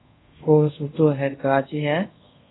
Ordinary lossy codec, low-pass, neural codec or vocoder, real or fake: AAC, 16 kbps; 7.2 kHz; codec, 24 kHz, 0.5 kbps, DualCodec; fake